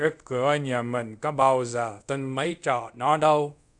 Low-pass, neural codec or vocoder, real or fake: 10.8 kHz; codec, 24 kHz, 0.5 kbps, DualCodec; fake